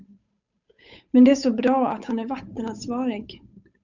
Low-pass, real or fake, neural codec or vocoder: 7.2 kHz; fake; codec, 16 kHz, 8 kbps, FunCodec, trained on Chinese and English, 25 frames a second